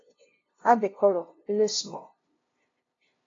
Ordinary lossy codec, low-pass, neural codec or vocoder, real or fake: AAC, 32 kbps; 7.2 kHz; codec, 16 kHz, 0.5 kbps, FunCodec, trained on LibriTTS, 25 frames a second; fake